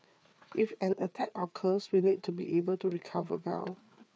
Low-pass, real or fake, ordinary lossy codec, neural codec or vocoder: none; fake; none; codec, 16 kHz, 4 kbps, FreqCodec, larger model